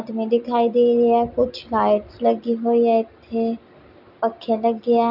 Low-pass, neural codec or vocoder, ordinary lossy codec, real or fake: 5.4 kHz; none; none; real